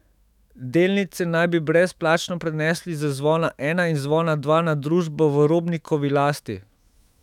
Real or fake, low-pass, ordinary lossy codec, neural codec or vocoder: fake; 19.8 kHz; none; autoencoder, 48 kHz, 128 numbers a frame, DAC-VAE, trained on Japanese speech